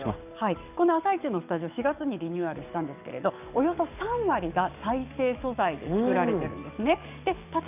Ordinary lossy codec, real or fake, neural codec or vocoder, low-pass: none; fake; codec, 44.1 kHz, 7.8 kbps, DAC; 3.6 kHz